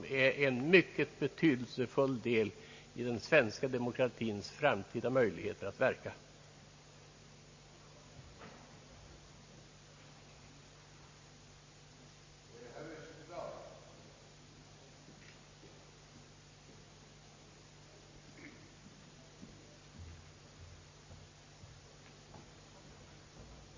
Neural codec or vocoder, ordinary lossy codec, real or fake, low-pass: none; MP3, 32 kbps; real; 7.2 kHz